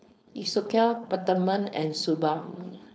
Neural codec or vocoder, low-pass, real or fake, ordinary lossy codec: codec, 16 kHz, 4.8 kbps, FACodec; none; fake; none